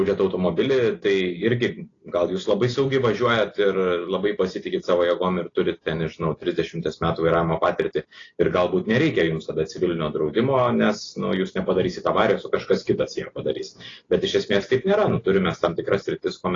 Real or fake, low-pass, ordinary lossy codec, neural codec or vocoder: real; 7.2 kHz; AAC, 32 kbps; none